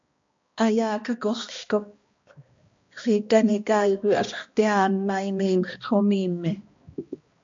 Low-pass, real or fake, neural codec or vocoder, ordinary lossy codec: 7.2 kHz; fake; codec, 16 kHz, 1 kbps, X-Codec, HuBERT features, trained on balanced general audio; MP3, 48 kbps